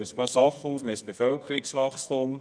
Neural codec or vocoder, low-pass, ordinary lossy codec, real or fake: codec, 24 kHz, 0.9 kbps, WavTokenizer, medium music audio release; 9.9 kHz; none; fake